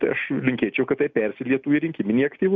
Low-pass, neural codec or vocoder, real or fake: 7.2 kHz; none; real